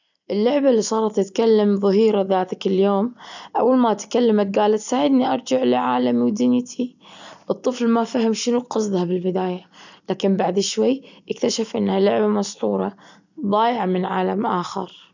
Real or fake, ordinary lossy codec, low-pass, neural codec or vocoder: real; none; 7.2 kHz; none